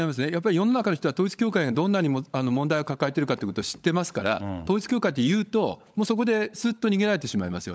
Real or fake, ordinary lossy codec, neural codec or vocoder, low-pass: fake; none; codec, 16 kHz, 16 kbps, FunCodec, trained on LibriTTS, 50 frames a second; none